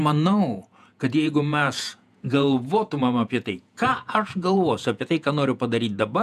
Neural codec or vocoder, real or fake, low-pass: vocoder, 48 kHz, 128 mel bands, Vocos; fake; 14.4 kHz